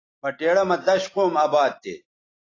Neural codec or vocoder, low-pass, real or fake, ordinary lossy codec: none; 7.2 kHz; real; AAC, 32 kbps